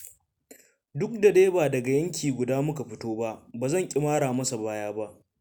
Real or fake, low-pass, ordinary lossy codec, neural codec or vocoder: real; none; none; none